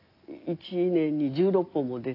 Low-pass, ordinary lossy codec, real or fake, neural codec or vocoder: 5.4 kHz; AAC, 32 kbps; real; none